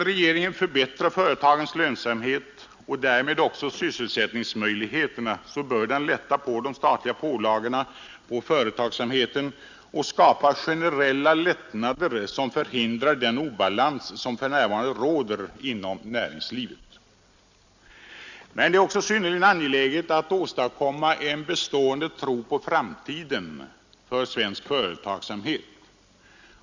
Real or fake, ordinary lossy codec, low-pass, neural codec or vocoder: real; Opus, 64 kbps; 7.2 kHz; none